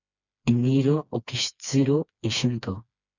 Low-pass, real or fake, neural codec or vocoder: 7.2 kHz; fake; codec, 16 kHz, 2 kbps, FreqCodec, smaller model